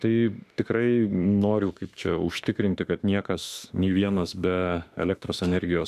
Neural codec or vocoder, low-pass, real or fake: autoencoder, 48 kHz, 32 numbers a frame, DAC-VAE, trained on Japanese speech; 14.4 kHz; fake